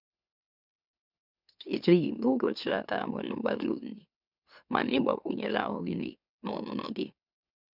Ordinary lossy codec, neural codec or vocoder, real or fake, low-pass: none; autoencoder, 44.1 kHz, a latent of 192 numbers a frame, MeloTTS; fake; 5.4 kHz